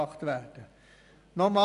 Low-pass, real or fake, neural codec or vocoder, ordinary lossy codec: 10.8 kHz; real; none; none